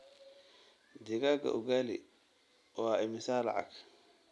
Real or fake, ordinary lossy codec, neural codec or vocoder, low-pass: real; none; none; none